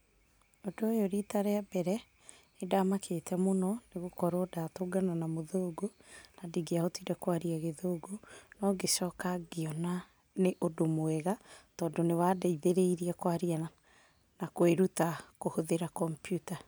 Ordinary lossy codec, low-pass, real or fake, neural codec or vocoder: none; none; real; none